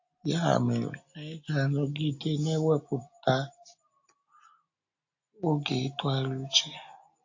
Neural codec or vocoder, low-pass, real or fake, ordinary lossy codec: none; 7.2 kHz; real; AAC, 48 kbps